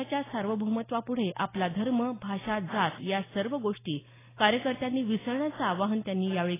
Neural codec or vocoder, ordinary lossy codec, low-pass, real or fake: none; AAC, 16 kbps; 3.6 kHz; real